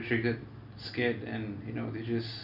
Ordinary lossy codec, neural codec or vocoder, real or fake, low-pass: none; none; real; 5.4 kHz